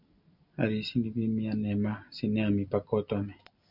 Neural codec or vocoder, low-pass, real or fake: none; 5.4 kHz; real